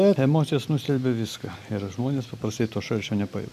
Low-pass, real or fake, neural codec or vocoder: 14.4 kHz; real; none